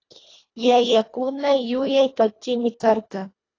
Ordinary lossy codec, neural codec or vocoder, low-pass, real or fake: AAC, 32 kbps; codec, 24 kHz, 1.5 kbps, HILCodec; 7.2 kHz; fake